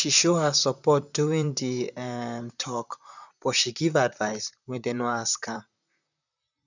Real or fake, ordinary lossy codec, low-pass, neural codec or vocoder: fake; none; 7.2 kHz; vocoder, 44.1 kHz, 128 mel bands, Pupu-Vocoder